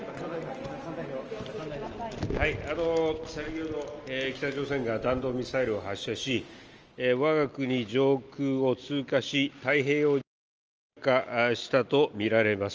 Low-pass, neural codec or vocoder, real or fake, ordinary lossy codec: 7.2 kHz; none; real; Opus, 24 kbps